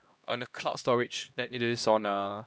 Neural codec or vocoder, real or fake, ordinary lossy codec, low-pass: codec, 16 kHz, 1 kbps, X-Codec, HuBERT features, trained on LibriSpeech; fake; none; none